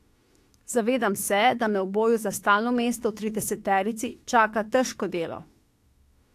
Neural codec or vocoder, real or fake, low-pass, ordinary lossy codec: autoencoder, 48 kHz, 32 numbers a frame, DAC-VAE, trained on Japanese speech; fake; 14.4 kHz; AAC, 64 kbps